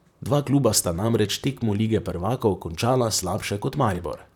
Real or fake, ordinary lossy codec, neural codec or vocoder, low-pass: fake; none; vocoder, 44.1 kHz, 128 mel bands, Pupu-Vocoder; 19.8 kHz